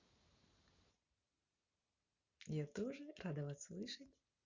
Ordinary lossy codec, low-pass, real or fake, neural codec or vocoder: Opus, 64 kbps; 7.2 kHz; real; none